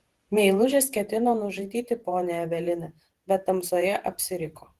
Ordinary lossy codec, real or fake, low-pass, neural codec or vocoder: Opus, 16 kbps; fake; 14.4 kHz; vocoder, 48 kHz, 128 mel bands, Vocos